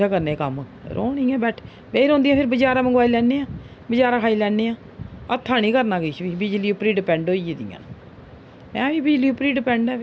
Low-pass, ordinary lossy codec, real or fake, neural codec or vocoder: none; none; real; none